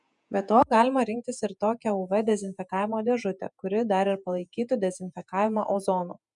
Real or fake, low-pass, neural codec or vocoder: fake; 10.8 kHz; vocoder, 48 kHz, 128 mel bands, Vocos